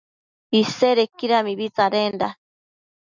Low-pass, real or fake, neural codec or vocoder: 7.2 kHz; real; none